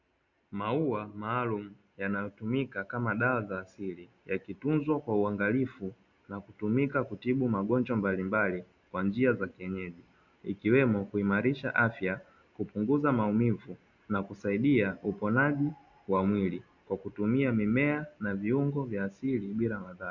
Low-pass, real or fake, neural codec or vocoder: 7.2 kHz; real; none